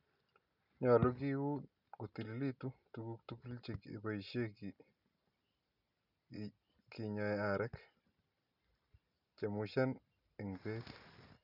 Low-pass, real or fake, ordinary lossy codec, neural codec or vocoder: 5.4 kHz; real; none; none